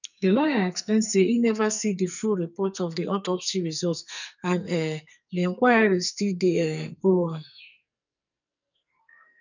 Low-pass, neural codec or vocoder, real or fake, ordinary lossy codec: 7.2 kHz; codec, 44.1 kHz, 2.6 kbps, SNAC; fake; none